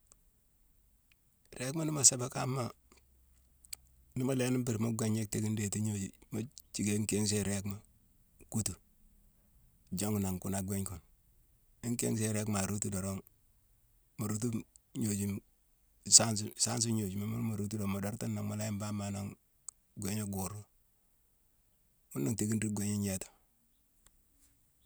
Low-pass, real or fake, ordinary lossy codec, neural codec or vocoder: none; real; none; none